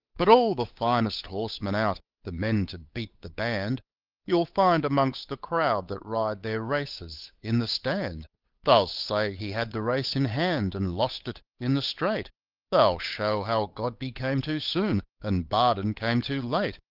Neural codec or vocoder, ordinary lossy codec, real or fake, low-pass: codec, 16 kHz, 8 kbps, FunCodec, trained on Chinese and English, 25 frames a second; Opus, 32 kbps; fake; 5.4 kHz